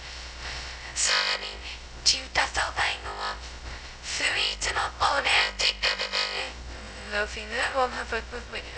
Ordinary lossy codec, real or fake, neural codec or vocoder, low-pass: none; fake; codec, 16 kHz, 0.2 kbps, FocalCodec; none